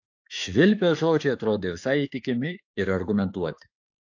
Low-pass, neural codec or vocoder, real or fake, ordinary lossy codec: 7.2 kHz; autoencoder, 48 kHz, 32 numbers a frame, DAC-VAE, trained on Japanese speech; fake; AAC, 48 kbps